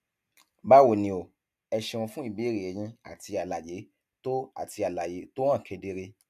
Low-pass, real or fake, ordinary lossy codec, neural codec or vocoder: 14.4 kHz; real; AAC, 96 kbps; none